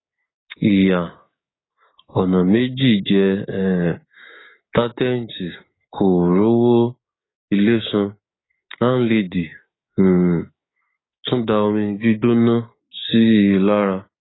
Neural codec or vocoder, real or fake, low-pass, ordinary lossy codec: codec, 16 kHz, 6 kbps, DAC; fake; 7.2 kHz; AAC, 16 kbps